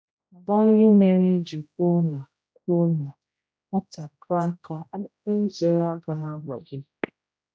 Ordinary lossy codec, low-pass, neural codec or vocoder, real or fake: none; none; codec, 16 kHz, 0.5 kbps, X-Codec, HuBERT features, trained on general audio; fake